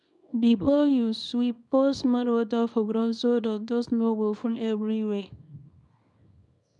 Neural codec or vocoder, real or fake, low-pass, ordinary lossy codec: codec, 24 kHz, 0.9 kbps, WavTokenizer, small release; fake; 10.8 kHz; none